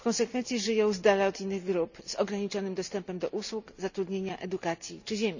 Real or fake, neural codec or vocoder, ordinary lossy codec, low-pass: real; none; none; 7.2 kHz